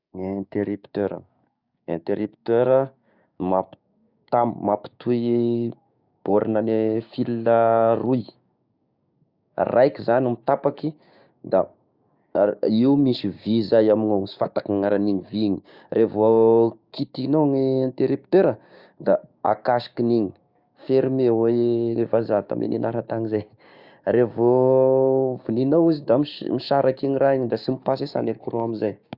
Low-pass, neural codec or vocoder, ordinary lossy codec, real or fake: 5.4 kHz; codec, 16 kHz, 6 kbps, DAC; Opus, 64 kbps; fake